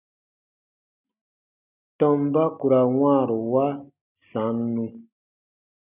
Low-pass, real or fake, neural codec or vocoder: 3.6 kHz; real; none